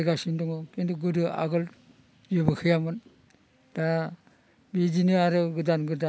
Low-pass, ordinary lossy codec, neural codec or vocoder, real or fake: none; none; none; real